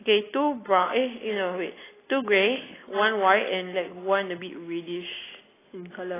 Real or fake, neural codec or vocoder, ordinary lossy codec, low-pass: real; none; AAC, 16 kbps; 3.6 kHz